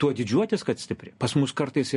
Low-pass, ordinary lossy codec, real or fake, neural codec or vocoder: 10.8 kHz; MP3, 48 kbps; real; none